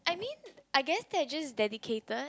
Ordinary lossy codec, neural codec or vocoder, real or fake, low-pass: none; none; real; none